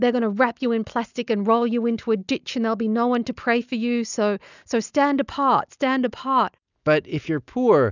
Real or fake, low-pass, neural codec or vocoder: real; 7.2 kHz; none